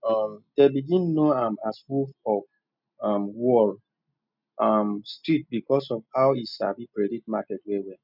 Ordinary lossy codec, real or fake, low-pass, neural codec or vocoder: none; real; 5.4 kHz; none